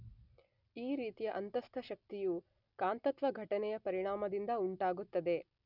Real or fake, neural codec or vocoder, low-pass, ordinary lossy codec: real; none; 5.4 kHz; none